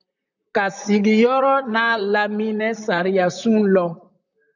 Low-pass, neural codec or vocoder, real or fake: 7.2 kHz; vocoder, 44.1 kHz, 128 mel bands, Pupu-Vocoder; fake